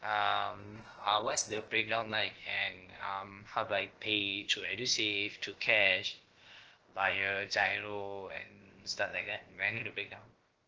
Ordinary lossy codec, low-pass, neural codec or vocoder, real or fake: Opus, 16 kbps; 7.2 kHz; codec, 16 kHz, about 1 kbps, DyCAST, with the encoder's durations; fake